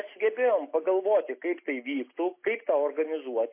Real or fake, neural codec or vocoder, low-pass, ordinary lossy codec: real; none; 3.6 kHz; MP3, 24 kbps